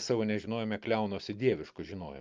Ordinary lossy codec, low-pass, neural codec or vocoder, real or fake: Opus, 24 kbps; 7.2 kHz; none; real